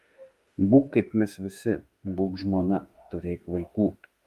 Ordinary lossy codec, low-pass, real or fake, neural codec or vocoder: Opus, 32 kbps; 14.4 kHz; fake; autoencoder, 48 kHz, 32 numbers a frame, DAC-VAE, trained on Japanese speech